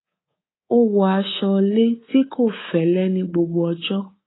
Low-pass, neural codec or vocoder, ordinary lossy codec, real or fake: 7.2 kHz; codec, 24 kHz, 3.1 kbps, DualCodec; AAC, 16 kbps; fake